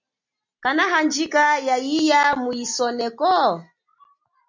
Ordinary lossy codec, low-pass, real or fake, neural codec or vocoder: MP3, 64 kbps; 7.2 kHz; real; none